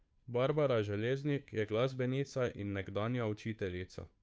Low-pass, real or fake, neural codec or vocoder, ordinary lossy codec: none; fake; codec, 16 kHz, 2 kbps, FunCodec, trained on Chinese and English, 25 frames a second; none